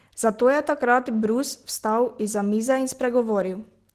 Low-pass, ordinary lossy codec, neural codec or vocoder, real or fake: 14.4 kHz; Opus, 16 kbps; none; real